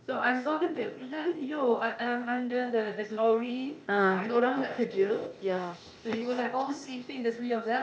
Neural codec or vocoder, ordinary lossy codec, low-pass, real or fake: codec, 16 kHz, 0.8 kbps, ZipCodec; none; none; fake